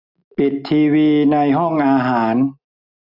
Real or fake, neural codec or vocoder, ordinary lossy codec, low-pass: real; none; none; 5.4 kHz